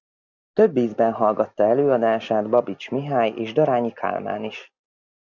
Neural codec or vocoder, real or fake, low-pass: none; real; 7.2 kHz